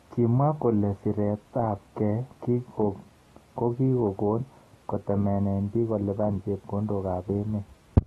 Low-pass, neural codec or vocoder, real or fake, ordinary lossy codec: 19.8 kHz; none; real; AAC, 32 kbps